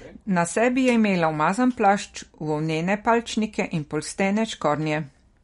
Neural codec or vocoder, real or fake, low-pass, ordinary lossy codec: none; real; 10.8 kHz; MP3, 48 kbps